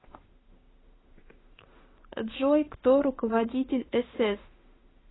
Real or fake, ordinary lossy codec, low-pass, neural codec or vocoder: fake; AAC, 16 kbps; 7.2 kHz; autoencoder, 48 kHz, 32 numbers a frame, DAC-VAE, trained on Japanese speech